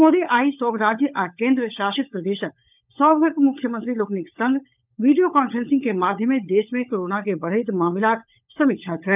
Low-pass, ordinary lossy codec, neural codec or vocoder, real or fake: 3.6 kHz; none; codec, 16 kHz, 16 kbps, FunCodec, trained on LibriTTS, 50 frames a second; fake